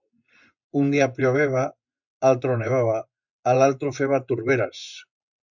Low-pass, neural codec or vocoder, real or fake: 7.2 kHz; vocoder, 24 kHz, 100 mel bands, Vocos; fake